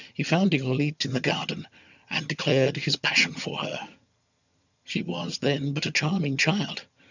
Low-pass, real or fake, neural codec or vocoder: 7.2 kHz; fake; vocoder, 22.05 kHz, 80 mel bands, HiFi-GAN